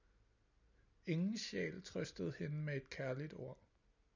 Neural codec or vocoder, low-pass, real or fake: none; 7.2 kHz; real